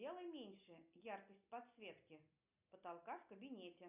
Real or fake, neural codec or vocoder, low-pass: real; none; 3.6 kHz